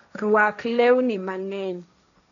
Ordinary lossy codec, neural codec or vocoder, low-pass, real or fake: none; codec, 16 kHz, 1.1 kbps, Voila-Tokenizer; 7.2 kHz; fake